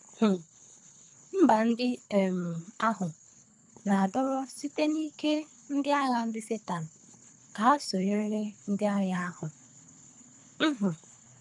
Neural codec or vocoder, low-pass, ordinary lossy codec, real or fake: codec, 24 kHz, 3 kbps, HILCodec; 10.8 kHz; MP3, 96 kbps; fake